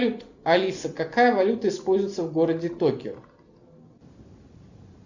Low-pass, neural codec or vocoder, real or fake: 7.2 kHz; none; real